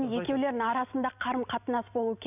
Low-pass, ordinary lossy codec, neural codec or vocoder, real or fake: 3.6 kHz; none; none; real